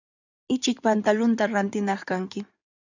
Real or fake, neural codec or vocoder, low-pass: fake; codec, 16 kHz in and 24 kHz out, 2.2 kbps, FireRedTTS-2 codec; 7.2 kHz